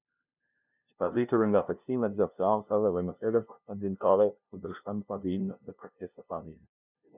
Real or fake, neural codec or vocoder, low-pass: fake; codec, 16 kHz, 0.5 kbps, FunCodec, trained on LibriTTS, 25 frames a second; 3.6 kHz